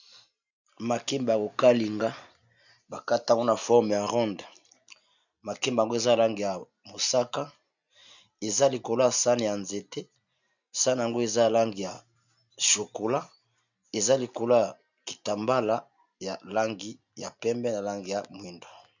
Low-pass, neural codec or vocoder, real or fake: 7.2 kHz; none; real